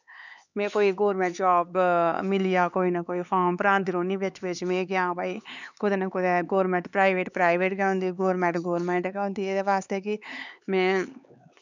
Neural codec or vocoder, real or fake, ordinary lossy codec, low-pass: codec, 16 kHz, 4 kbps, X-Codec, HuBERT features, trained on LibriSpeech; fake; none; 7.2 kHz